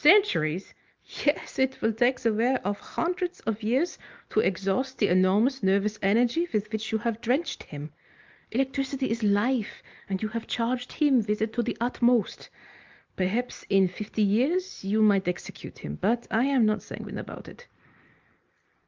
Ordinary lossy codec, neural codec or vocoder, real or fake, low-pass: Opus, 24 kbps; none; real; 7.2 kHz